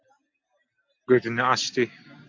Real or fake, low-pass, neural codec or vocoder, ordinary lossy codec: real; 7.2 kHz; none; MP3, 64 kbps